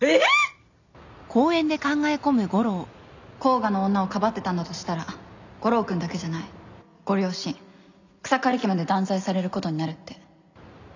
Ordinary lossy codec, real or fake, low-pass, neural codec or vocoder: none; real; 7.2 kHz; none